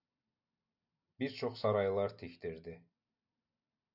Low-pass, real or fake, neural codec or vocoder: 5.4 kHz; real; none